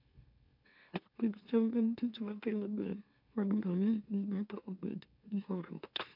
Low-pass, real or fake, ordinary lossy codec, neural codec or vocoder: 5.4 kHz; fake; MP3, 48 kbps; autoencoder, 44.1 kHz, a latent of 192 numbers a frame, MeloTTS